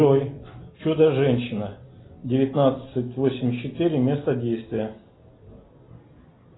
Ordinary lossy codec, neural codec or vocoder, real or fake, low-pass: AAC, 16 kbps; none; real; 7.2 kHz